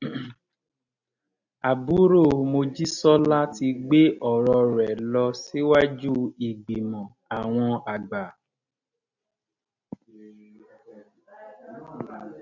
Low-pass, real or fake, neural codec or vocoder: 7.2 kHz; real; none